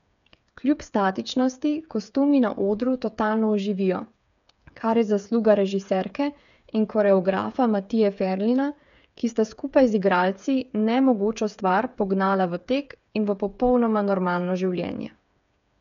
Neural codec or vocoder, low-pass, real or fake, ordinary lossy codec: codec, 16 kHz, 8 kbps, FreqCodec, smaller model; 7.2 kHz; fake; none